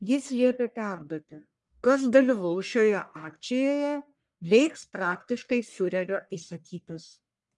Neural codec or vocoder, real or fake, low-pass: codec, 44.1 kHz, 1.7 kbps, Pupu-Codec; fake; 10.8 kHz